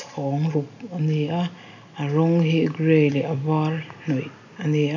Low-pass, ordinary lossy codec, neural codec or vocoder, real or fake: 7.2 kHz; none; none; real